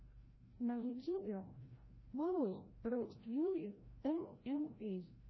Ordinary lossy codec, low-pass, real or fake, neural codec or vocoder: MP3, 24 kbps; 7.2 kHz; fake; codec, 16 kHz, 0.5 kbps, FreqCodec, larger model